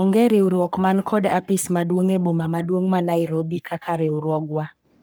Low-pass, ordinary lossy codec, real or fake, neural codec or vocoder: none; none; fake; codec, 44.1 kHz, 3.4 kbps, Pupu-Codec